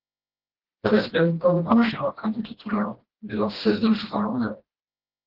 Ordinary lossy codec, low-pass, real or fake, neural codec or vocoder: Opus, 16 kbps; 5.4 kHz; fake; codec, 16 kHz, 1 kbps, FreqCodec, smaller model